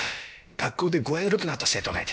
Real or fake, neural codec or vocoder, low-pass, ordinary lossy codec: fake; codec, 16 kHz, about 1 kbps, DyCAST, with the encoder's durations; none; none